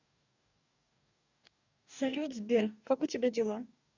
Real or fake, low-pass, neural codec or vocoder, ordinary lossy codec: fake; 7.2 kHz; codec, 44.1 kHz, 2.6 kbps, DAC; none